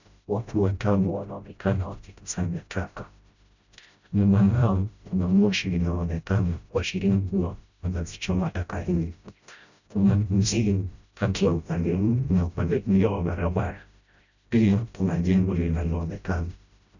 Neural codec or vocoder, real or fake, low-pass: codec, 16 kHz, 0.5 kbps, FreqCodec, smaller model; fake; 7.2 kHz